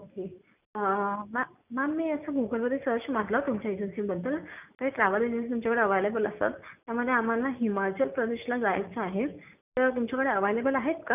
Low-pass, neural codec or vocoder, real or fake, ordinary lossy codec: 3.6 kHz; none; real; none